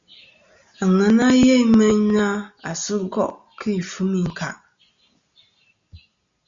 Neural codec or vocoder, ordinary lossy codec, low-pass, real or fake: none; Opus, 64 kbps; 7.2 kHz; real